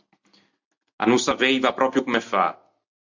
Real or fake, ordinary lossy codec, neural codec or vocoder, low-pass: real; MP3, 64 kbps; none; 7.2 kHz